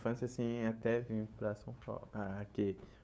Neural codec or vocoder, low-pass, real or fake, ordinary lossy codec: codec, 16 kHz, 8 kbps, FunCodec, trained on LibriTTS, 25 frames a second; none; fake; none